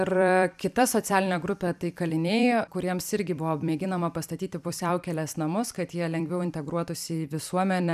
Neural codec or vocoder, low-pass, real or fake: vocoder, 44.1 kHz, 128 mel bands every 256 samples, BigVGAN v2; 14.4 kHz; fake